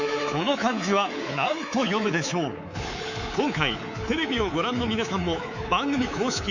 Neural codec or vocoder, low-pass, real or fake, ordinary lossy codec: codec, 24 kHz, 3.1 kbps, DualCodec; 7.2 kHz; fake; none